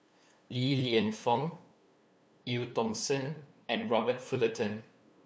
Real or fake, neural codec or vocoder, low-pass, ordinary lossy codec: fake; codec, 16 kHz, 2 kbps, FunCodec, trained on LibriTTS, 25 frames a second; none; none